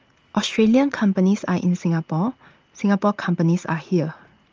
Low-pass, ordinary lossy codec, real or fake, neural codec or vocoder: 7.2 kHz; Opus, 24 kbps; fake; vocoder, 44.1 kHz, 80 mel bands, Vocos